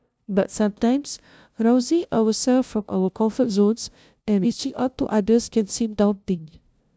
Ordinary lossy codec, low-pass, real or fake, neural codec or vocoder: none; none; fake; codec, 16 kHz, 0.5 kbps, FunCodec, trained on LibriTTS, 25 frames a second